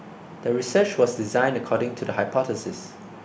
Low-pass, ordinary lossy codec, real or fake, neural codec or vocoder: none; none; real; none